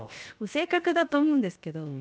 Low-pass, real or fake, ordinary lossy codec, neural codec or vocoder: none; fake; none; codec, 16 kHz, 0.7 kbps, FocalCodec